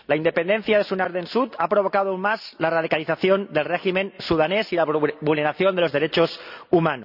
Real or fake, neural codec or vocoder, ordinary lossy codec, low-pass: real; none; none; 5.4 kHz